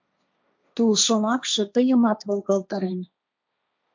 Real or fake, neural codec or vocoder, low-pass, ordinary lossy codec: fake; codec, 16 kHz, 1.1 kbps, Voila-Tokenizer; 7.2 kHz; MP3, 64 kbps